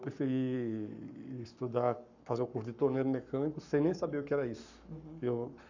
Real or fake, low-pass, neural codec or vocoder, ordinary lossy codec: fake; 7.2 kHz; codec, 44.1 kHz, 7.8 kbps, Pupu-Codec; none